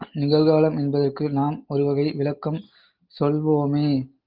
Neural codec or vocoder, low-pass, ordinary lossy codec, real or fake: none; 5.4 kHz; Opus, 32 kbps; real